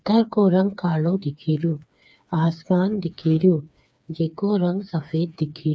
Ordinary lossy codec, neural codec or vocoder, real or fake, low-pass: none; codec, 16 kHz, 4 kbps, FreqCodec, smaller model; fake; none